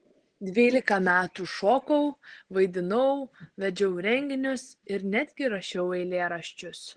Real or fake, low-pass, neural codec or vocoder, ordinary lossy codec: real; 9.9 kHz; none; Opus, 16 kbps